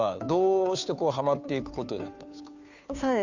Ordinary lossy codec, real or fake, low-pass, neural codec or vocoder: none; fake; 7.2 kHz; codec, 16 kHz, 2 kbps, FunCodec, trained on Chinese and English, 25 frames a second